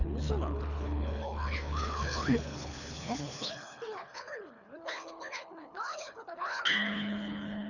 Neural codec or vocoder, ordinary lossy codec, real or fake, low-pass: codec, 24 kHz, 3 kbps, HILCodec; none; fake; 7.2 kHz